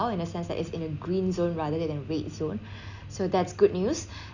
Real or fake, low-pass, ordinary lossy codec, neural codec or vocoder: real; 7.2 kHz; none; none